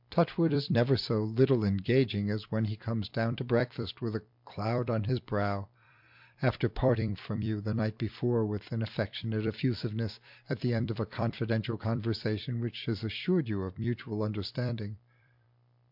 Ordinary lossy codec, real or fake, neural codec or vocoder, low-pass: MP3, 48 kbps; fake; vocoder, 44.1 kHz, 128 mel bands every 256 samples, BigVGAN v2; 5.4 kHz